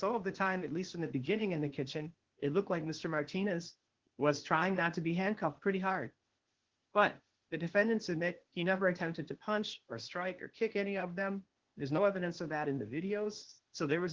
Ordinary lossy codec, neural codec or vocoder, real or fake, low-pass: Opus, 16 kbps; codec, 16 kHz, 0.8 kbps, ZipCodec; fake; 7.2 kHz